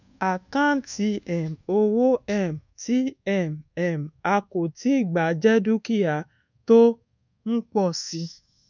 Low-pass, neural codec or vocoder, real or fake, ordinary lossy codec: 7.2 kHz; codec, 24 kHz, 1.2 kbps, DualCodec; fake; none